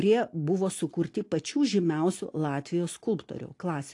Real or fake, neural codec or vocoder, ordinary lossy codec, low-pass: real; none; AAC, 48 kbps; 10.8 kHz